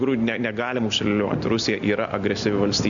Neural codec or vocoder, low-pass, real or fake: none; 7.2 kHz; real